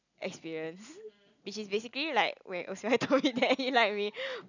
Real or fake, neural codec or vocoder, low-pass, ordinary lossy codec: real; none; 7.2 kHz; none